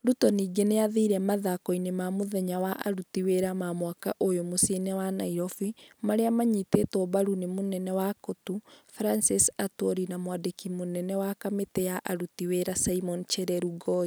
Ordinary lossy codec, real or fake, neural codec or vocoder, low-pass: none; real; none; none